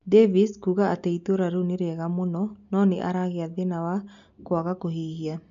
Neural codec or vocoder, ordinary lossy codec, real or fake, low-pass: none; MP3, 64 kbps; real; 7.2 kHz